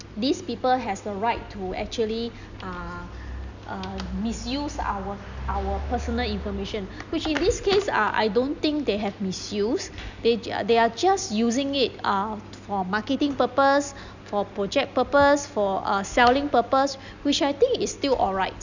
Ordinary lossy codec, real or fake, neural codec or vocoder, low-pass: none; real; none; 7.2 kHz